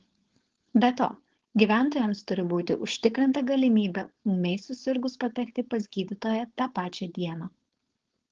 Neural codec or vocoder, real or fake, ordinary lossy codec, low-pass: codec, 16 kHz, 4.8 kbps, FACodec; fake; Opus, 16 kbps; 7.2 kHz